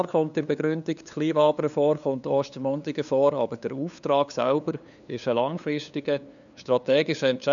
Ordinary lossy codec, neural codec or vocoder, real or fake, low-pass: none; codec, 16 kHz, 2 kbps, FunCodec, trained on LibriTTS, 25 frames a second; fake; 7.2 kHz